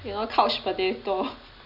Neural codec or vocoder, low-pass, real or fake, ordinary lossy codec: none; 5.4 kHz; real; none